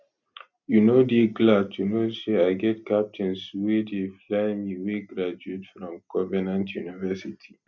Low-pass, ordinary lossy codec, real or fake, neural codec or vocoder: 7.2 kHz; none; real; none